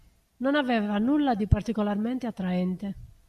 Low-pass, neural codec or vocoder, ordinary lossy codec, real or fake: 14.4 kHz; none; AAC, 96 kbps; real